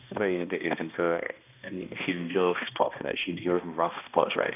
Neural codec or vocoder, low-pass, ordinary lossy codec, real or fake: codec, 16 kHz, 1 kbps, X-Codec, HuBERT features, trained on balanced general audio; 3.6 kHz; none; fake